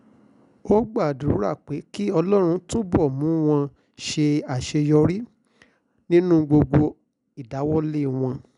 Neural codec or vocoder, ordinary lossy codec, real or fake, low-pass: none; none; real; 10.8 kHz